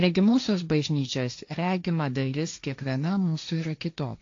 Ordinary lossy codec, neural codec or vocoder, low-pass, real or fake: AAC, 48 kbps; codec, 16 kHz, 1.1 kbps, Voila-Tokenizer; 7.2 kHz; fake